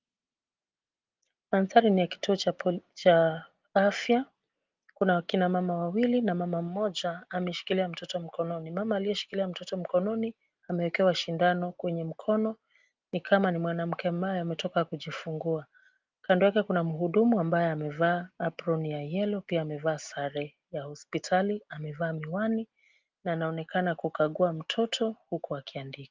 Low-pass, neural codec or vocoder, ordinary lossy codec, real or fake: 7.2 kHz; none; Opus, 32 kbps; real